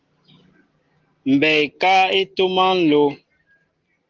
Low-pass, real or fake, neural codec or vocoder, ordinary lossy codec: 7.2 kHz; real; none; Opus, 16 kbps